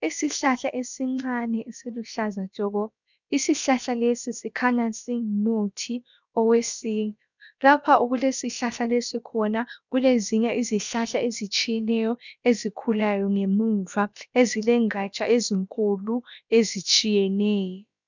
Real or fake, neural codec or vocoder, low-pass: fake; codec, 16 kHz, about 1 kbps, DyCAST, with the encoder's durations; 7.2 kHz